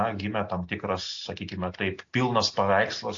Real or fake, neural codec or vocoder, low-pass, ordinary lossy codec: real; none; 7.2 kHz; AAC, 48 kbps